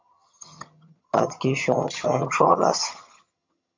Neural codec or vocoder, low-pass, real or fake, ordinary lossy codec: vocoder, 22.05 kHz, 80 mel bands, HiFi-GAN; 7.2 kHz; fake; MP3, 48 kbps